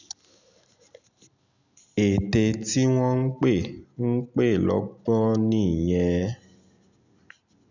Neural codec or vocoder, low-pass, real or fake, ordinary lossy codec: none; 7.2 kHz; real; none